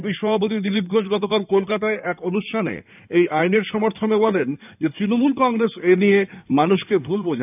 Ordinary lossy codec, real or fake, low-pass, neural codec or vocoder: none; fake; 3.6 kHz; codec, 16 kHz in and 24 kHz out, 2.2 kbps, FireRedTTS-2 codec